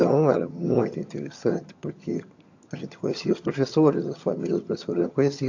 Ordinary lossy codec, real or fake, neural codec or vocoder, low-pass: AAC, 48 kbps; fake; vocoder, 22.05 kHz, 80 mel bands, HiFi-GAN; 7.2 kHz